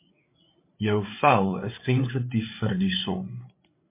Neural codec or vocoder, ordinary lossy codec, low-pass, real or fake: codec, 16 kHz, 8 kbps, FreqCodec, larger model; MP3, 24 kbps; 3.6 kHz; fake